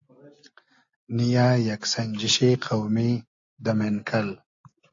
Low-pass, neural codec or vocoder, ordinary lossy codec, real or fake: 7.2 kHz; none; AAC, 48 kbps; real